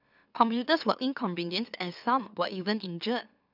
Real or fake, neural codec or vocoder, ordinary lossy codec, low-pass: fake; autoencoder, 44.1 kHz, a latent of 192 numbers a frame, MeloTTS; none; 5.4 kHz